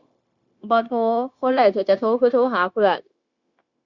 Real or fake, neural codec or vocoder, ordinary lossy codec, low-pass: fake; codec, 16 kHz, 0.9 kbps, LongCat-Audio-Codec; Opus, 64 kbps; 7.2 kHz